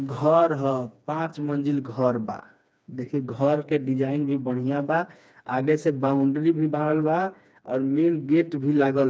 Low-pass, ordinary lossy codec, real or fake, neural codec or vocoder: none; none; fake; codec, 16 kHz, 2 kbps, FreqCodec, smaller model